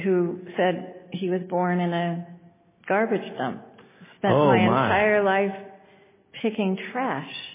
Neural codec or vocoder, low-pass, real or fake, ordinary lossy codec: none; 3.6 kHz; real; MP3, 16 kbps